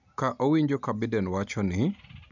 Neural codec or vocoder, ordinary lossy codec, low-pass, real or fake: none; none; 7.2 kHz; real